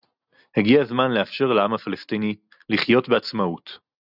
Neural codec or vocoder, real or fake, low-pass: none; real; 5.4 kHz